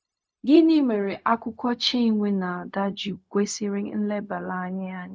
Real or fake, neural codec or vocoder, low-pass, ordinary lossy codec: fake; codec, 16 kHz, 0.4 kbps, LongCat-Audio-Codec; none; none